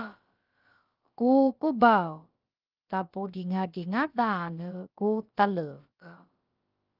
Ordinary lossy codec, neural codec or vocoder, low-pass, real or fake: Opus, 24 kbps; codec, 16 kHz, about 1 kbps, DyCAST, with the encoder's durations; 5.4 kHz; fake